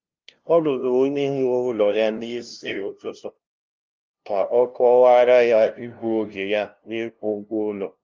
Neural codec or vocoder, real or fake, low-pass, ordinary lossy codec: codec, 16 kHz, 0.5 kbps, FunCodec, trained on LibriTTS, 25 frames a second; fake; 7.2 kHz; Opus, 32 kbps